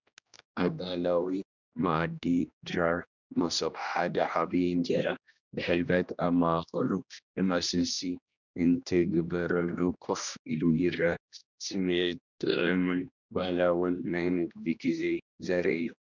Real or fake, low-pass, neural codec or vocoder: fake; 7.2 kHz; codec, 16 kHz, 1 kbps, X-Codec, HuBERT features, trained on general audio